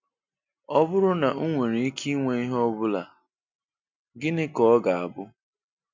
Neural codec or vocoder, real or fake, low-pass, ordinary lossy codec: none; real; 7.2 kHz; MP3, 64 kbps